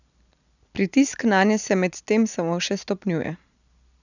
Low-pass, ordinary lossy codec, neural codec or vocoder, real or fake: 7.2 kHz; none; none; real